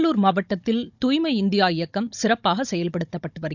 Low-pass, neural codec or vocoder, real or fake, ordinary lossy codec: 7.2 kHz; codec, 16 kHz, 16 kbps, FunCodec, trained on Chinese and English, 50 frames a second; fake; none